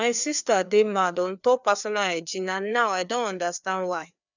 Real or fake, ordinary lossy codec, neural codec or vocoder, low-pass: fake; none; codec, 16 kHz, 2 kbps, FreqCodec, larger model; 7.2 kHz